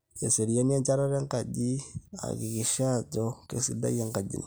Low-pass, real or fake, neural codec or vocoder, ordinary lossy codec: none; real; none; none